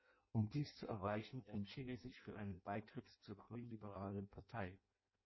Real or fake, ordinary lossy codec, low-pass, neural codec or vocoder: fake; MP3, 24 kbps; 7.2 kHz; codec, 16 kHz in and 24 kHz out, 0.6 kbps, FireRedTTS-2 codec